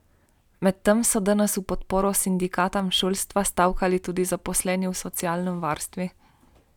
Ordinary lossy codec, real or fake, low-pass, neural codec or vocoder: none; real; 19.8 kHz; none